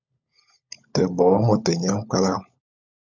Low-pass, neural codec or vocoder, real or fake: 7.2 kHz; codec, 16 kHz, 16 kbps, FunCodec, trained on LibriTTS, 50 frames a second; fake